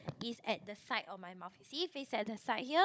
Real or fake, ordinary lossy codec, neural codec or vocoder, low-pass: fake; none; codec, 16 kHz, 16 kbps, FunCodec, trained on Chinese and English, 50 frames a second; none